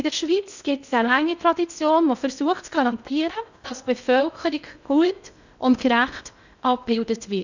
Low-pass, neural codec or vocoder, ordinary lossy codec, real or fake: 7.2 kHz; codec, 16 kHz in and 24 kHz out, 0.6 kbps, FocalCodec, streaming, 2048 codes; none; fake